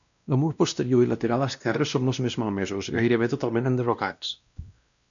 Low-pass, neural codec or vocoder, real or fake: 7.2 kHz; codec, 16 kHz, 1 kbps, X-Codec, WavLM features, trained on Multilingual LibriSpeech; fake